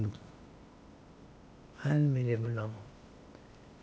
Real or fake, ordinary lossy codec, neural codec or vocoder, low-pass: fake; none; codec, 16 kHz, 0.8 kbps, ZipCodec; none